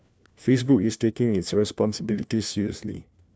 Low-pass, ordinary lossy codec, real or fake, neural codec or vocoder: none; none; fake; codec, 16 kHz, 1 kbps, FunCodec, trained on LibriTTS, 50 frames a second